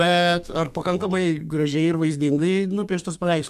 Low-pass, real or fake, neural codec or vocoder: 14.4 kHz; fake; codec, 32 kHz, 1.9 kbps, SNAC